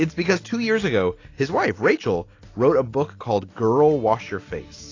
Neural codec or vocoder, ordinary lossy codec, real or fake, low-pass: none; AAC, 32 kbps; real; 7.2 kHz